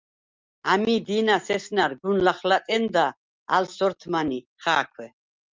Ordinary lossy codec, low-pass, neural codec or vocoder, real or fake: Opus, 24 kbps; 7.2 kHz; none; real